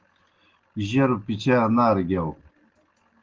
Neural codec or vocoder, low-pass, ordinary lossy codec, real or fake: none; 7.2 kHz; Opus, 16 kbps; real